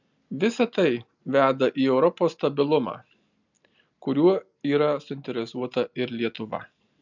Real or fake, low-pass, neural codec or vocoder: real; 7.2 kHz; none